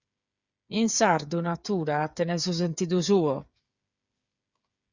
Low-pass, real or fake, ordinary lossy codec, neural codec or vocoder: 7.2 kHz; fake; Opus, 64 kbps; codec, 16 kHz, 8 kbps, FreqCodec, smaller model